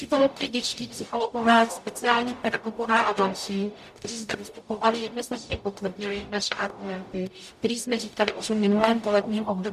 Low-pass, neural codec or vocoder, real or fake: 14.4 kHz; codec, 44.1 kHz, 0.9 kbps, DAC; fake